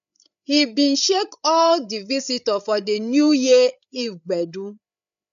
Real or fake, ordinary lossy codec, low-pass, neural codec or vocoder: fake; none; 7.2 kHz; codec, 16 kHz, 8 kbps, FreqCodec, larger model